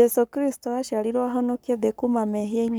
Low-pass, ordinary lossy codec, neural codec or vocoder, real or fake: none; none; codec, 44.1 kHz, 7.8 kbps, Pupu-Codec; fake